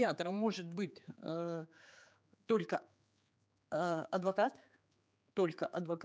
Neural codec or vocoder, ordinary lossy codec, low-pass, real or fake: codec, 16 kHz, 4 kbps, X-Codec, HuBERT features, trained on general audio; none; none; fake